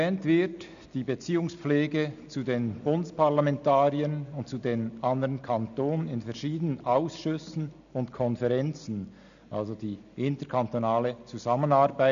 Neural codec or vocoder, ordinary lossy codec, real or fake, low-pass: none; none; real; 7.2 kHz